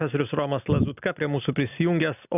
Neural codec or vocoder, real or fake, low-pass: none; real; 3.6 kHz